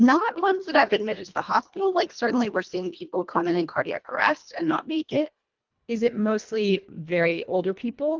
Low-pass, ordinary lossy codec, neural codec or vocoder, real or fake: 7.2 kHz; Opus, 24 kbps; codec, 24 kHz, 1.5 kbps, HILCodec; fake